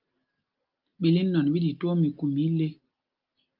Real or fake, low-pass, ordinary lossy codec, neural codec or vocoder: real; 5.4 kHz; Opus, 24 kbps; none